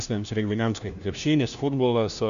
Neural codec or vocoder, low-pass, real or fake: codec, 16 kHz, 1 kbps, FunCodec, trained on LibriTTS, 50 frames a second; 7.2 kHz; fake